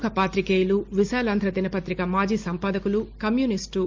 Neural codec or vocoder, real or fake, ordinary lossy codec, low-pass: none; real; Opus, 32 kbps; 7.2 kHz